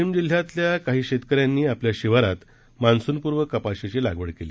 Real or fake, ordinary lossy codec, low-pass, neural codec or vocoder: real; none; none; none